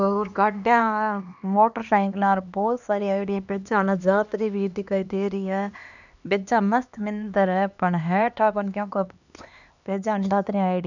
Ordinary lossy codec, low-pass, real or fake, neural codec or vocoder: none; 7.2 kHz; fake; codec, 16 kHz, 2 kbps, X-Codec, HuBERT features, trained on LibriSpeech